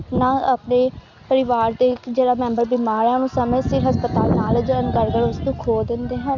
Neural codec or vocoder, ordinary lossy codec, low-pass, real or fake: none; Opus, 64 kbps; 7.2 kHz; real